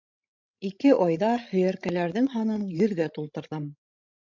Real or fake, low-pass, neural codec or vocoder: fake; 7.2 kHz; codec, 16 kHz, 16 kbps, FreqCodec, larger model